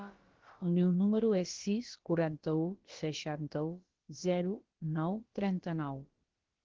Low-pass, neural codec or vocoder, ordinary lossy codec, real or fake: 7.2 kHz; codec, 16 kHz, about 1 kbps, DyCAST, with the encoder's durations; Opus, 16 kbps; fake